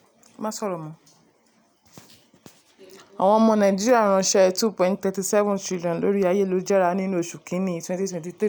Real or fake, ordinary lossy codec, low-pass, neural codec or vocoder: real; none; none; none